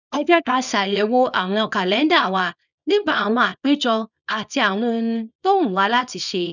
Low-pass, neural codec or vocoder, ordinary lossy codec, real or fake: 7.2 kHz; codec, 24 kHz, 0.9 kbps, WavTokenizer, small release; none; fake